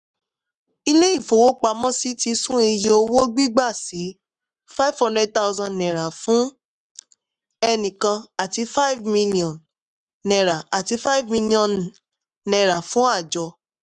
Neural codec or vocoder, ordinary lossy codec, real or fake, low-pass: codec, 44.1 kHz, 7.8 kbps, Pupu-Codec; none; fake; 10.8 kHz